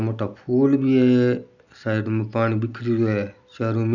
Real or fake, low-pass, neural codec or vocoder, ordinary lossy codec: real; 7.2 kHz; none; none